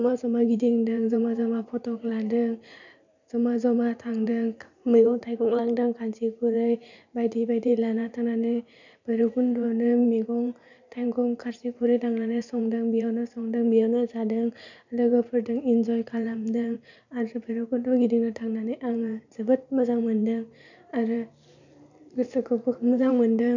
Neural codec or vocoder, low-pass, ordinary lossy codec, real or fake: vocoder, 44.1 kHz, 128 mel bands every 512 samples, BigVGAN v2; 7.2 kHz; none; fake